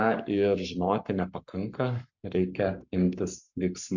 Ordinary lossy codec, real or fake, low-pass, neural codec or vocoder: MP3, 64 kbps; fake; 7.2 kHz; codec, 44.1 kHz, 7.8 kbps, Pupu-Codec